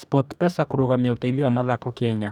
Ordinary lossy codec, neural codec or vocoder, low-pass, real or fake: none; codec, 44.1 kHz, 2.6 kbps, DAC; 19.8 kHz; fake